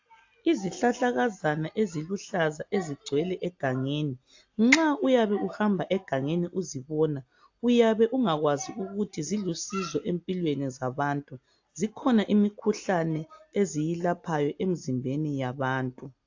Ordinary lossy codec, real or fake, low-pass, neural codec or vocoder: AAC, 48 kbps; real; 7.2 kHz; none